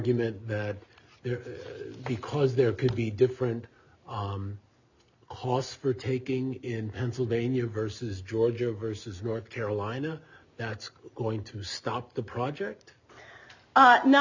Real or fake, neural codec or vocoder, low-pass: real; none; 7.2 kHz